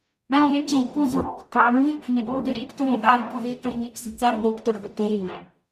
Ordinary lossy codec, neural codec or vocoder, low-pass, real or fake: none; codec, 44.1 kHz, 0.9 kbps, DAC; 14.4 kHz; fake